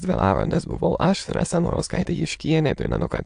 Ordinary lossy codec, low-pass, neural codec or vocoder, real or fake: AAC, 64 kbps; 9.9 kHz; autoencoder, 22.05 kHz, a latent of 192 numbers a frame, VITS, trained on many speakers; fake